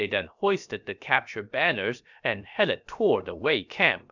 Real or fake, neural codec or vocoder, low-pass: fake; codec, 16 kHz, about 1 kbps, DyCAST, with the encoder's durations; 7.2 kHz